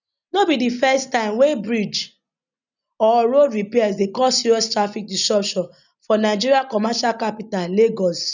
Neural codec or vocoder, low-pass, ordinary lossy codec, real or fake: none; 7.2 kHz; none; real